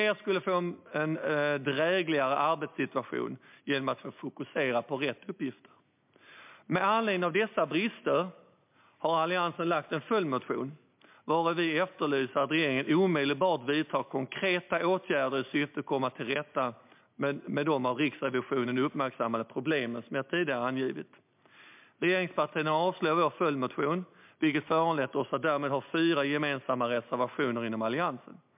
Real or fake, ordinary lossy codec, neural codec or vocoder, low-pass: real; MP3, 32 kbps; none; 3.6 kHz